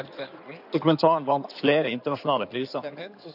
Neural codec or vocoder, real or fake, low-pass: codec, 16 kHz in and 24 kHz out, 2.2 kbps, FireRedTTS-2 codec; fake; 5.4 kHz